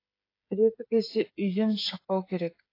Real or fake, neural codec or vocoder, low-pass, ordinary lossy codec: fake; codec, 16 kHz, 8 kbps, FreqCodec, smaller model; 5.4 kHz; AAC, 32 kbps